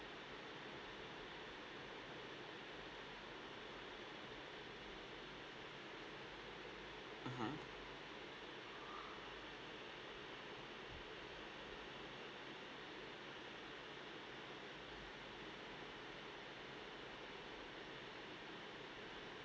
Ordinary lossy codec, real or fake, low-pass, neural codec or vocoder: none; real; none; none